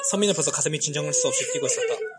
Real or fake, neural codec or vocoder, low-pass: real; none; 9.9 kHz